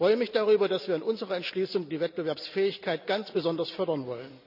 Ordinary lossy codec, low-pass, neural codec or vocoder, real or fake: none; 5.4 kHz; none; real